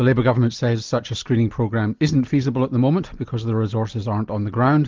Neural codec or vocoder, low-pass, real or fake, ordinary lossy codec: none; 7.2 kHz; real; Opus, 32 kbps